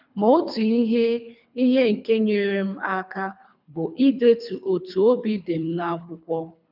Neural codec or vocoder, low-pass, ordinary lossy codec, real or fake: codec, 24 kHz, 3 kbps, HILCodec; 5.4 kHz; none; fake